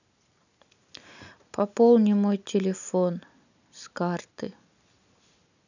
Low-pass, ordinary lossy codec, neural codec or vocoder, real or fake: 7.2 kHz; none; none; real